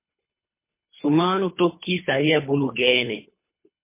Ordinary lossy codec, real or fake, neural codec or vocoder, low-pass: MP3, 24 kbps; fake; codec, 24 kHz, 3 kbps, HILCodec; 3.6 kHz